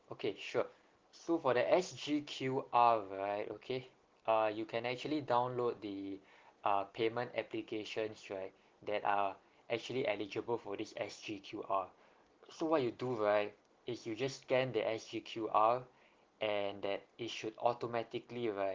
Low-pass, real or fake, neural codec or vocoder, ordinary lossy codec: 7.2 kHz; real; none; Opus, 16 kbps